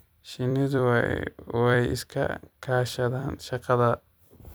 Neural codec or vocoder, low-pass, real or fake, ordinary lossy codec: vocoder, 44.1 kHz, 128 mel bands every 256 samples, BigVGAN v2; none; fake; none